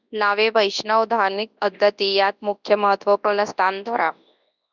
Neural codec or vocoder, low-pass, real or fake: codec, 24 kHz, 0.9 kbps, WavTokenizer, large speech release; 7.2 kHz; fake